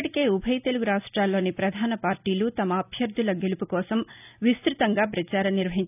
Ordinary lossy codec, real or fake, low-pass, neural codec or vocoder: none; real; 3.6 kHz; none